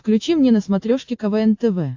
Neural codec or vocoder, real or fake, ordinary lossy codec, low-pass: none; real; AAC, 48 kbps; 7.2 kHz